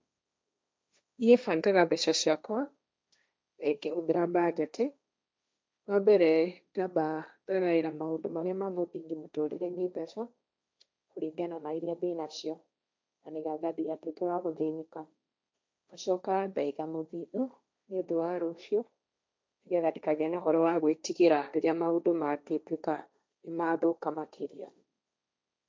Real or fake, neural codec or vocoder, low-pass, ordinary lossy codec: fake; codec, 16 kHz, 1.1 kbps, Voila-Tokenizer; none; none